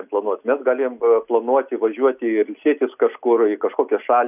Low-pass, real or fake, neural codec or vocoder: 3.6 kHz; real; none